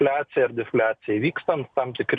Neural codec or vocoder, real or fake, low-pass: none; real; 9.9 kHz